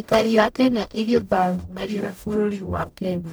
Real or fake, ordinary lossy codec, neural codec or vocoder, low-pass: fake; none; codec, 44.1 kHz, 0.9 kbps, DAC; none